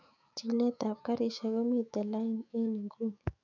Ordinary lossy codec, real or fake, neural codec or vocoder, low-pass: none; fake; autoencoder, 48 kHz, 128 numbers a frame, DAC-VAE, trained on Japanese speech; 7.2 kHz